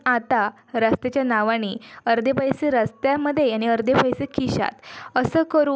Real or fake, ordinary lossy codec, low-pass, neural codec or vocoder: real; none; none; none